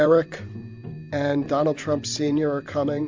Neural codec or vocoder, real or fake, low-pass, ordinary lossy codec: vocoder, 44.1 kHz, 128 mel bands every 512 samples, BigVGAN v2; fake; 7.2 kHz; MP3, 64 kbps